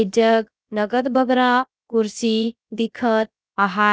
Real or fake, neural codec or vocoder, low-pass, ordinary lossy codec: fake; codec, 16 kHz, about 1 kbps, DyCAST, with the encoder's durations; none; none